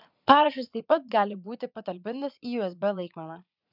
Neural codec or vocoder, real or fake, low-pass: vocoder, 22.05 kHz, 80 mel bands, Vocos; fake; 5.4 kHz